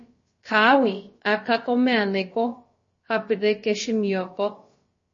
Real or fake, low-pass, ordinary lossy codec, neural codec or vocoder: fake; 7.2 kHz; MP3, 32 kbps; codec, 16 kHz, about 1 kbps, DyCAST, with the encoder's durations